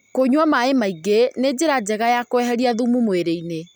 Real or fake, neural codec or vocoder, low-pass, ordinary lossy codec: real; none; none; none